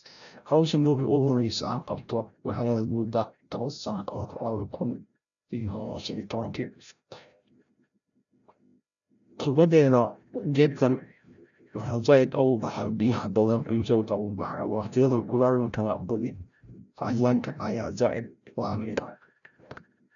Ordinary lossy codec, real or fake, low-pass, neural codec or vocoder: none; fake; 7.2 kHz; codec, 16 kHz, 0.5 kbps, FreqCodec, larger model